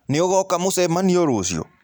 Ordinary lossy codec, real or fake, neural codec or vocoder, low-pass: none; real; none; none